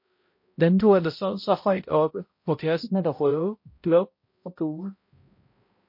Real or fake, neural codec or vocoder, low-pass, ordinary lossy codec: fake; codec, 16 kHz, 0.5 kbps, X-Codec, HuBERT features, trained on balanced general audio; 5.4 kHz; MP3, 32 kbps